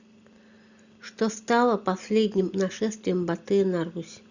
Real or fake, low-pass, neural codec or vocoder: real; 7.2 kHz; none